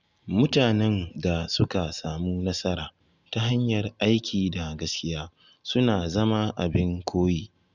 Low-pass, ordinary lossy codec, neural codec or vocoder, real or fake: 7.2 kHz; Opus, 64 kbps; none; real